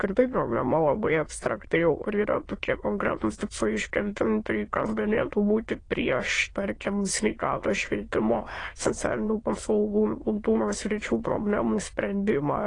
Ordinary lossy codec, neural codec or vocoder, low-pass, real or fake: AAC, 32 kbps; autoencoder, 22.05 kHz, a latent of 192 numbers a frame, VITS, trained on many speakers; 9.9 kHz; fake